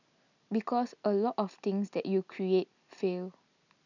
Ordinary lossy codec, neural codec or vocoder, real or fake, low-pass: none; none; real; 7.2 kHz